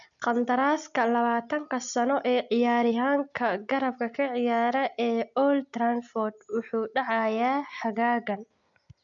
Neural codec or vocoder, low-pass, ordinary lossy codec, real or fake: none; 7.2 kHz; none; real